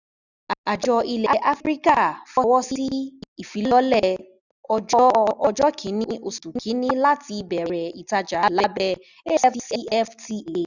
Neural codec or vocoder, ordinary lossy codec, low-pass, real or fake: none; none; 7.2 kHz; real